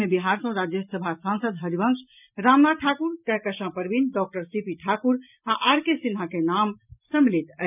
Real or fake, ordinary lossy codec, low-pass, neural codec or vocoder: real; none; 3.6 kHz; none